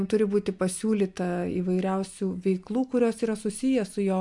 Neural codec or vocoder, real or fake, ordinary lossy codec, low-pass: none; real; MP3, 64 kbps; 10.8 kHz